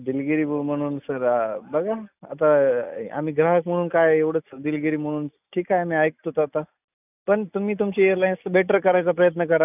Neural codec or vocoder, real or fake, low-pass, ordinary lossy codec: none; real; 3.6 kHz; none